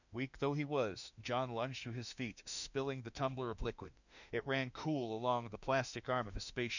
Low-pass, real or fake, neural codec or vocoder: 7.2 kHz; fake; autoencoder, 48 kHz, 32 numbers a frame, DAC-VAE, trained on Japanese speech